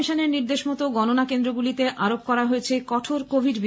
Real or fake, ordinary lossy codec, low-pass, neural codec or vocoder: real; none; none; none